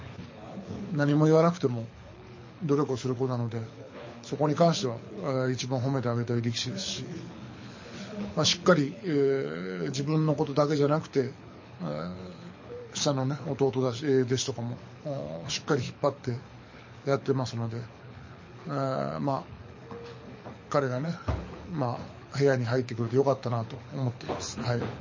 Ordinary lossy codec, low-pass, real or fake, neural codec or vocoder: MP3, 32 kbps; 7.2 kHz; fake; codec, 24 kHz, 6 kbps, HILCodec